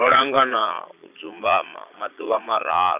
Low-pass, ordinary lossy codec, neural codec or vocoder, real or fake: 3.6 kHz; AAC, 32 kbps; vocoder, 22.05 kHz, 80 mel bands, Vocos; fake